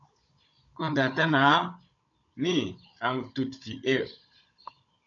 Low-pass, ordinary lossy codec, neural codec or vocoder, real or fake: 7.2 kHz; MP3, 96 kbps; codec, 16 kHz, 16 kbps, FunCodec, trained on Chinese and English, 50 frames a second; fake